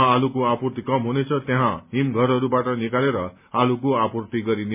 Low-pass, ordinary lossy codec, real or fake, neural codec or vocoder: 3.6 kHz; MP3, 32 kbps; real; none